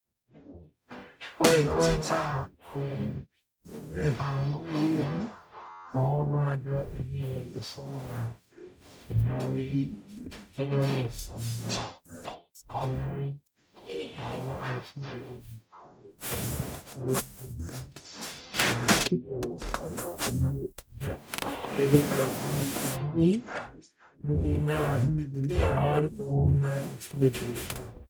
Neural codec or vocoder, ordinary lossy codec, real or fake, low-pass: codec, 44.1 kHz, 0.9 kbps, DAC; none; fake; none